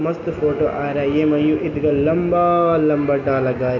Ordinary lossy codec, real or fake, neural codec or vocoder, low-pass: none; real; none; 7.2 kHz